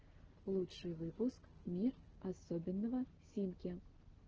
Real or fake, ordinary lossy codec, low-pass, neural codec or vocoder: real; Opus, 16 kbps; 7.2 kHz; none